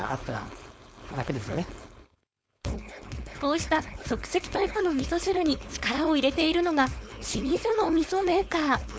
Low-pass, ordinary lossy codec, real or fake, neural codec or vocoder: none; none; fake; codec, 16 kHz, 4.8 kbps, FACodec